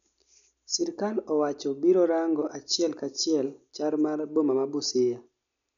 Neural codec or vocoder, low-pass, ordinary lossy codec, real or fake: none; 7.2 kHz; none; real